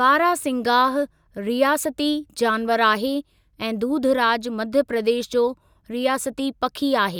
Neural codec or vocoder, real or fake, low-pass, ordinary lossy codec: none; real; 19.8 kHz; none